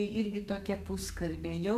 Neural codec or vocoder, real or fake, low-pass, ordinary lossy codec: codec, 32 kHz, 1.9 kbps, SNAC; fake; 14.4 kHz; MP3, 96 kbps